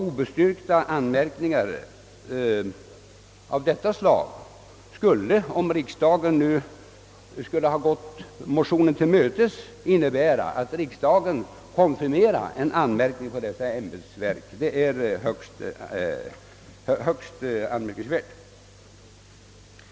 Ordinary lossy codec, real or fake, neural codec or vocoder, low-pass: none; real; none; none